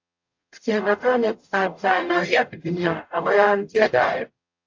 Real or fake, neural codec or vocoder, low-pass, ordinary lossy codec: fake; codec, 44.1 kHz, 0.9 kbps, DAC; 7.2 kHz; AAC, 48 kbps